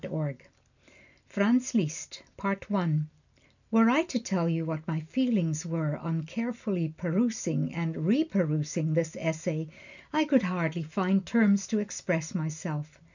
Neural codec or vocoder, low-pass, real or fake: none; 7.2 kHz; real